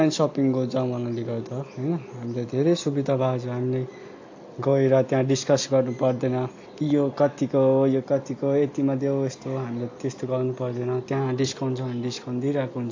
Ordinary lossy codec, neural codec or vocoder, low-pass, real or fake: AAC, 48 kbps; none; 7.2 kHz; real